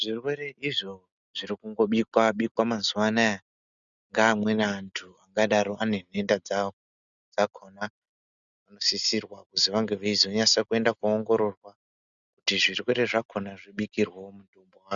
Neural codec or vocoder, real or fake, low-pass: none; real; 7.2 kHz